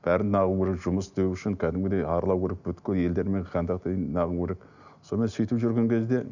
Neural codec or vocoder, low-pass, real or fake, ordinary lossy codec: none; 7.2 kHz; real; none